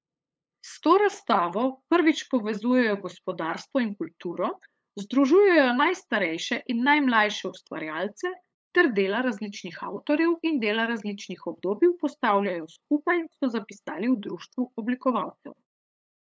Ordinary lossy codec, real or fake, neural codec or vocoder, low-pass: none; fake; codec, 16 kHz, 8 kbps, FunCodec, trained on LibriTTS, 25 frames a second; none